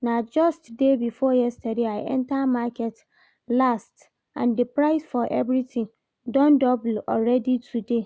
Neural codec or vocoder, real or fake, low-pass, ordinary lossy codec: none; real; none; none